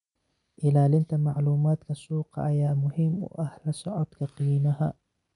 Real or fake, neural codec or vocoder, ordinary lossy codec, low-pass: real; none; none; 10.8 kHz